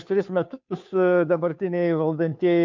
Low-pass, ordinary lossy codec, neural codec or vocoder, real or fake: 7.2 kHz; MP3, 64 kbps; codec, 16 kHz, 2 kbps, FunCodec, trained on Chinese and English, 25 frames a second; fake